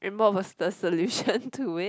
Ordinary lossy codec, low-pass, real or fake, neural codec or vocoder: none; none; real; none